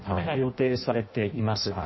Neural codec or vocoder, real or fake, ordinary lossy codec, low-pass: codec, 16 kHz in and 24 kHz out, 0.6 kbps, FireRedTTS-2 codec; fake; MP3, 24 kbps; 7.2 kHz